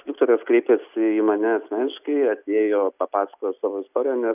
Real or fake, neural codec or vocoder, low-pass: real; none; 3.6 kHz